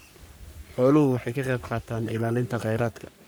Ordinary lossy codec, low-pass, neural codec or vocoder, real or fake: none; none; codec, 44.1 kHz, 3.4 kbps, Pupu-Codec; fake